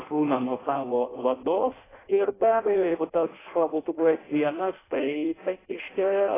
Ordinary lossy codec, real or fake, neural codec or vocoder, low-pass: AAC, 16 kbps; fake; codec, 16 kHz in and 24 kHz out, 0.6 kbps, FireRedTTS-2 codec; 3.6 kHz